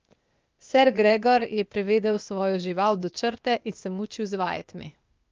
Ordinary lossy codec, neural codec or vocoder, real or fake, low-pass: Opus, 24 kbps; codec, 16 kHz, 0.8 kbps, ZipCodec; fake; 7.2 kHz